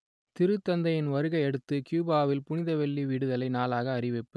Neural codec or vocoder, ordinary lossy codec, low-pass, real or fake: none; none; none; real